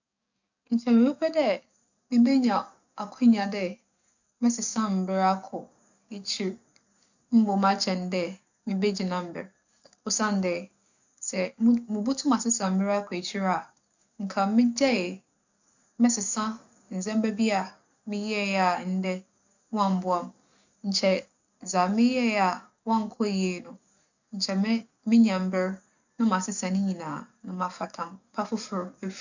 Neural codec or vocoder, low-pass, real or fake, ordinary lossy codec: codec, 44.1 kHz, 7.8 kbps, DAC; 7.2 kHz; fake; none